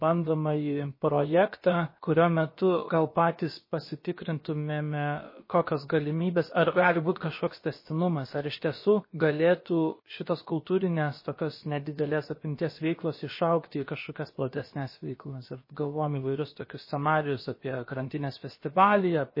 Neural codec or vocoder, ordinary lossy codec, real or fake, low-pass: codec, 16 kHz, about 1 kbps, DyCAST, with the encoder's durations; MP3, 24 kbps; fake; 5.4 kHz